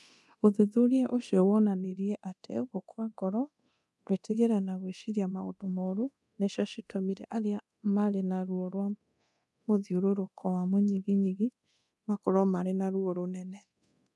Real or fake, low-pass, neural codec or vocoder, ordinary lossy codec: fake; none; codec, 24 kHz, 0.9 kbps, DualCodec; none